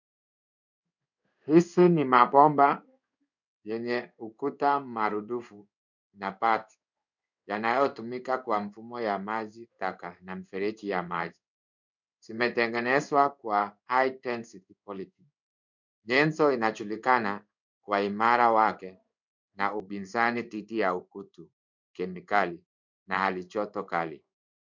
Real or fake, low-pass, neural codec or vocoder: fake; 7.2 kHz; codec, 16 kHz in and 24 kHz out, 1 kbps, XY-Tokenizer